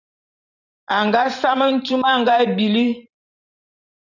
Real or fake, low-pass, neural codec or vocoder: fake; 7.2 kHz; vocoder, 44.1 kHz, 128 mel bands every 512 samples, BigVGAN v2